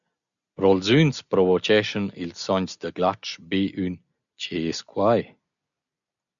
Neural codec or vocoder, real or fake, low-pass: none; real; 7.2 kHz